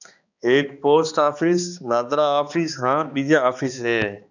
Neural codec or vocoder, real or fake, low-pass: codec, 16 kHz, 2 kbps, X-Codec, HuBERT features, trained on balanced general audio; fake; 7.2 kHz